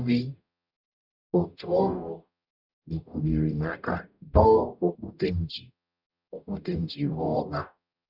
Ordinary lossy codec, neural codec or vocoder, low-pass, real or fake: none; codec, 44.1 kHz, 0.9 kbps, DAC; 5.4 kHz; fake